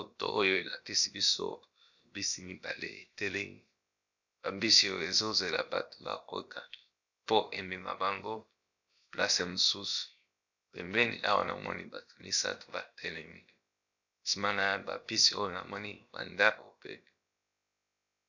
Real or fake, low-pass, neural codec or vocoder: fake; 7.2 kHz; codec, 16 kHz, about 1 kbps, DyCAST, with the encoder's durations